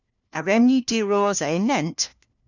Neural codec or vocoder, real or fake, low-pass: codec, 16 kHz, 1 kbps, FunCodec, trained on LibriTTS, 50 frames a second; fake; 7.2 kHz